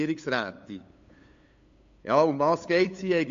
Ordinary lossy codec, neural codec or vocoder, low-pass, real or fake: MP3, 48 kbps; codec, 16 kHz, 8 kbps, FunCodec, trained on LibriTTS, 25 frames a second; 7.2 kHz; fake